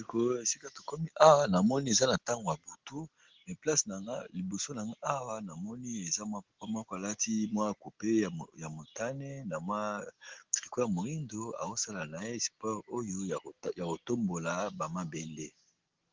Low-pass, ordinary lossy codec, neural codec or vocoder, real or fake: 7.2 kHz; Opus, 16 kbps; none; real